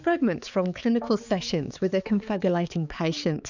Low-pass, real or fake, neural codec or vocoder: 7.2 kHz; fake; codec, 16 kHz, 4 kbps, X-Codec, HuBERT features, trained on balanced general audio